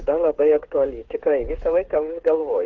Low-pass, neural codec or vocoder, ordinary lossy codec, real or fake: 7.2 kHz; codec, 16 kHz, 8 kbps, FreqCodec, smaller model; Opus, 16 kbps; fake